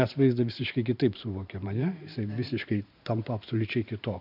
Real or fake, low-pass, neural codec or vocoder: fake; 5.4 kHz; vocoder, 44.1 kHz, 128 mel bands every 512 samples, BigVGAN v2